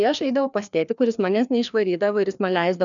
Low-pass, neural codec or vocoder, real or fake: 7.2 kHz; codec, 16 kHz, 2 kbps, FreqCodec, larger model; fake